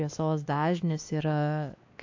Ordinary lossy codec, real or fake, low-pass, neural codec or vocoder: AAC, 48 kbps; fake; 7.2 kHz; codec, 16 kHz, 2 kbps, X-Codec, WavLM features, trained on Multilingual LibriSpeech